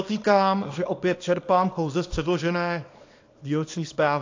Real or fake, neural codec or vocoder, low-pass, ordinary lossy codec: fake; codec, 24 kHz, 0.9 kbps, WavTokenizer, small release; 7.2 kHz; AAC, 48 kbps